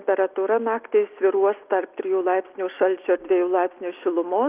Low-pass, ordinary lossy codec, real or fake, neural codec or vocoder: 3.6 kHz; Opus, 24 kbps; real; none